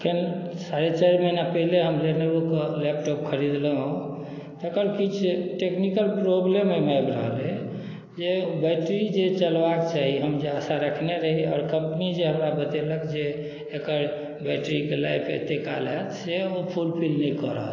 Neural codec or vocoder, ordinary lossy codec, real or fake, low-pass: none; AAC, 32 kbps; real; 7.2 kHz